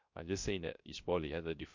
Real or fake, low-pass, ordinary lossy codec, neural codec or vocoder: fake; 7.2 kHz; MP3, 48 kbps; codec, 16 kHz, 0.3 kbps, FocalCodec